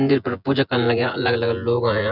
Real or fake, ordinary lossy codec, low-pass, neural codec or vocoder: fake; none; 5.4 kHz; vocoder, 24 kHz, 100 mel bands, Vocos